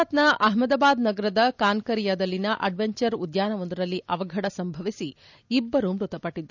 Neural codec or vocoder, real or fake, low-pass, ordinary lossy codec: none; real; 7.2 kHz; none